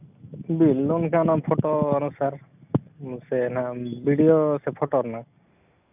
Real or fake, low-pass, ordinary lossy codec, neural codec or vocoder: real; 3.6 kHz; none; none